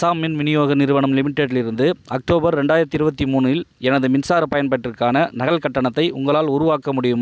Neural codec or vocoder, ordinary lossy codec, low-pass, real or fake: none; none; none; real